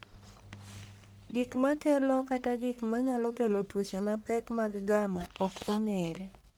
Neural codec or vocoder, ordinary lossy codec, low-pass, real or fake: codec, 44.1 kHz, 1.7 kbps, Pupu-Codec; none; none; fake